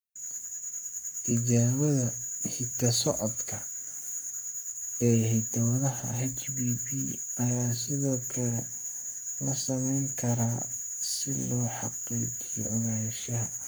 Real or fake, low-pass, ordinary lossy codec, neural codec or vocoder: fake; none; none; codec, 44.1 kHz, 7.8 kbps, Pupu-Codec